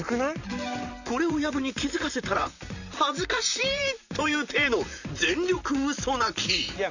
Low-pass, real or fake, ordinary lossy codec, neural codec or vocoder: 7.2 kHz; fake; none; vocoder, 44.1 kHz, 128 mel bands, Pupu-Vocoder